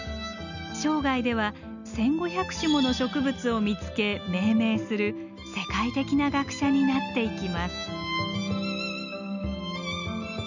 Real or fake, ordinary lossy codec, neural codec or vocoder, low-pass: real; none; none; 7.2 kHz